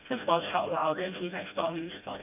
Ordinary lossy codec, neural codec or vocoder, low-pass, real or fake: none; codec, 16 kHz, 1 kbps, FreqCodec, smaller model; 3.6 kHz; fake